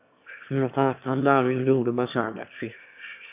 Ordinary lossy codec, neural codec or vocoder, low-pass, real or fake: MP3, 32 kbps; autoencoder, 22.05 kHz, a latent of 192 numbers a frame, VITS, trained on one speaker; 3.6 kHz; fake